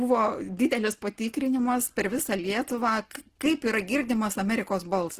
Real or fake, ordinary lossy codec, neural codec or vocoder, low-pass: real; Opus, 16 kbps; none; 14.4 kHz